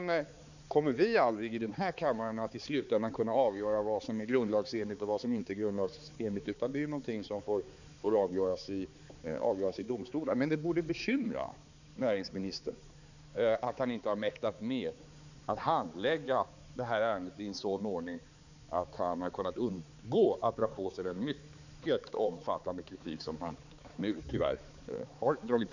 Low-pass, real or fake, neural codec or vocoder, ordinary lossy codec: 7.2 kHz; fake; codec, 16 kHz, 4 kbps, X-Codec, HuBERT features, trained on balanced general audio; none